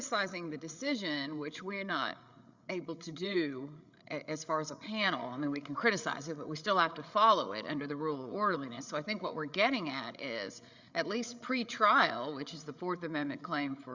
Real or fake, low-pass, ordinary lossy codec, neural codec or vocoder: fake; 7.2 kHz; Opus, 64 kbps; codec, 16 kHz, 8 kbps, FreqCodec, larger model